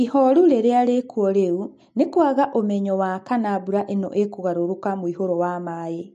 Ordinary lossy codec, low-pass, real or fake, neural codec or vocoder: MP3, 48 kbps; 14.4 kHz; real; none